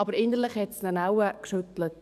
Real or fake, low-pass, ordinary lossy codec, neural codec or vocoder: fake; 14.4 kHz; none; codec, 44.1 kHz, 7.8 kbps, DAC